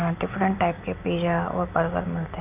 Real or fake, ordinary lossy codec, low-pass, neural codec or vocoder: real; none; 3.6 kHz; none